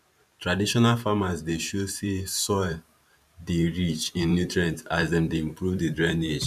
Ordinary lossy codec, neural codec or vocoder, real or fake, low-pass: none; vocoder, 44.1 kHz, 128 mel bands every 512 samples, BigVGAN v2; fake; 14.4 kHz